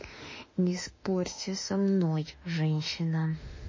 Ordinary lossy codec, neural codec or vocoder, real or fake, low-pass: MP3, 32 kbps; autoencoder, 48 kHz, 32 numbers a frame, DAC-VAE, trained on Japanese speech; fake; 7.2 kHz